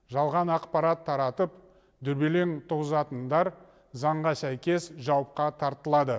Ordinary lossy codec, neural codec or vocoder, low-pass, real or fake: none; none; none; real